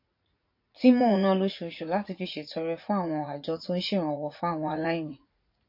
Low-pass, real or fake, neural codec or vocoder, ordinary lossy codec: 5.4 kHz; fake; vocoder, 44.1 kHz, 80 mel bands, Vocos; MP3, 32 kbps